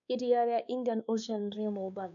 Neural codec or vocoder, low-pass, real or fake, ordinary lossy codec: codec, 16 kHz, 2 kbps, X-Codec, WavLM features, trained on Multilingual LibriSpeech; 7.2 kHz; fake; none